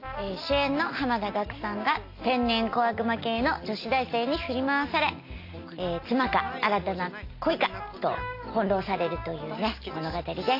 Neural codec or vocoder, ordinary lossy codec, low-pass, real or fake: none; AAC, 32 kbps; 5.4 kHz; real